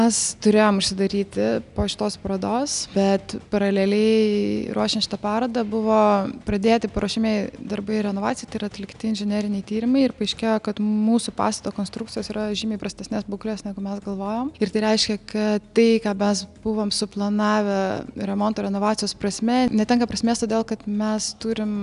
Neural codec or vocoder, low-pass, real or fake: none; 10.8 kHz; real